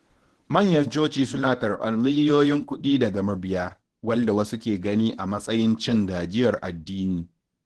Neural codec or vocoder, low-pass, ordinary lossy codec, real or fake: codec, 24 kHz, 0.9 kbps, WavTokenizer, medium speech release version 1; 10.8 kHz; Opus, 16 kbps; fake